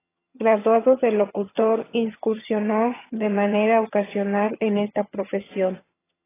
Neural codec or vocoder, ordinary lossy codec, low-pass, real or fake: vocoder, 22.05 kHz, 80 mel bands, HiFi-GAN; AAC, 16 kbps; 3.6 kHz; fake